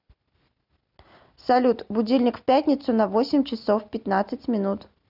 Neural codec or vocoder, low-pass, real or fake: none; 5.4 kHz; real